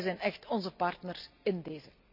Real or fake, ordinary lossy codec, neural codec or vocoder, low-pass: real; none; none; 5.4 kHz